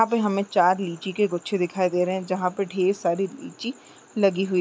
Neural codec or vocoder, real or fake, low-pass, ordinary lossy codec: none; real; none; none